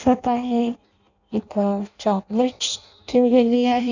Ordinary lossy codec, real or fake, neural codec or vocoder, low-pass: none; fake; codec, 16 kHz in and 24 kHz out, 0.6 kbps, FireRedTTS-2 codec; 7.2 kHz